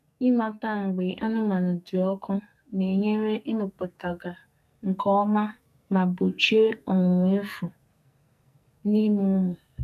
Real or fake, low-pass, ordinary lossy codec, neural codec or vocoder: fake; 14.4 kHz; none; codec, 44.1 kHz, 2.6 kbps, SNAC